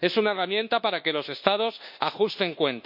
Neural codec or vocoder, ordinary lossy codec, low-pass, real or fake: codec, 24 kHz, 1.2 kbps, DualCodec; MP3, 48 kbps; 5.4 kHz; fake